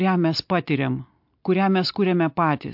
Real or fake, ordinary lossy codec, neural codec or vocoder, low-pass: real; MP3, 48 kbps; none; 5.4 kHz